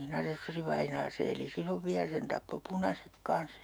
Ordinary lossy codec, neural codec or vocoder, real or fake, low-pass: none; autoencoder, 48 kHz, 128 numbers a frame, DAC-VAE, trained on Japanese speech; fake; none